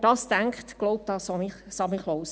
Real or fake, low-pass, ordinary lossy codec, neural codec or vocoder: real; none; none; none